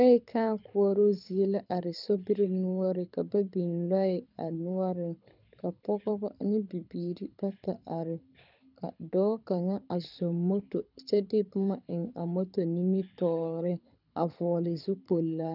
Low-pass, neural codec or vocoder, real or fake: 5.4 kHz; codec, 24 kHz, 6 kbps, HILCodec; fake